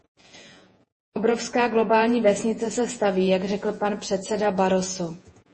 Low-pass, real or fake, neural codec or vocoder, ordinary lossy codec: 10.8 kHz; fake; vocoder, 48 kHz, 128 mel bands, Vocos; MP3, 32 kbps